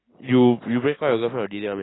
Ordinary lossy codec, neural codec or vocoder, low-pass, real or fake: AAC, 16 kbps; none; 7.2 kHz; real